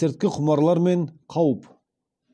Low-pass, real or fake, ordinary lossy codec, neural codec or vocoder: none; real; none; none